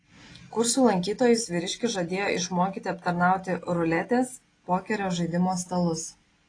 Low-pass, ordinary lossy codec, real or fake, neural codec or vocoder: 9.9 kHz; AAC, 32 kbps; real; none